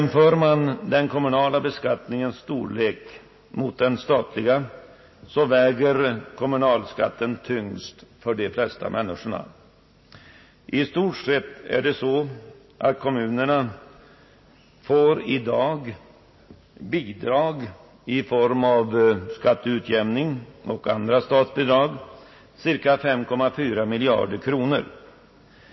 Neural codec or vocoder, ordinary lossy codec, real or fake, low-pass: none; MP3, 24 kbps; real; 7.2 kHz